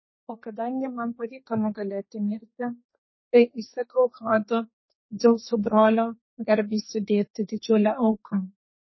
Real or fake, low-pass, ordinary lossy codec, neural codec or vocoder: fake; 7.2 kHz; MP3, 24 kbps; codec, 32 kHz, 1.9 kbps, SNAC